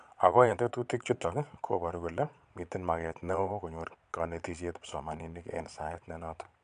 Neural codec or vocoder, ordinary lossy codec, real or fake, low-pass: vocoder, 22.05 kHz, 80 mel bands, Vocos; none; fake; 9.9 kHz